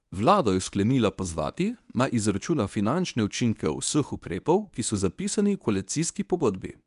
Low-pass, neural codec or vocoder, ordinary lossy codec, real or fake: 10.8 kHz; codec, 24 kHz, 0.9 kbps, WavTokenizer, medium speech release version 1; none; fake